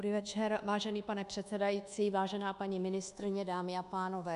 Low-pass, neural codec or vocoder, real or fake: 10.8 kHz; codec, 24 kHz, 1.2 kbps, DualCodec; fake